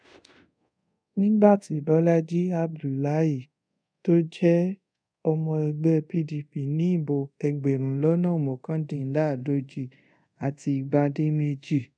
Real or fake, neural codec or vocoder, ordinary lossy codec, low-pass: fake; codec, 24 kHz, 0.5 kbps, DualCodec; none; 9.9 kHz